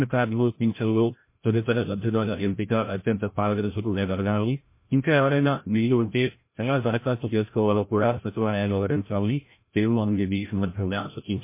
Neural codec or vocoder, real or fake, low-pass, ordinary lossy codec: codec, 16 kHz, 0.5 kbps, FreqCodec, larger model; fake; 3.6 kHz; MP3, 24 kbps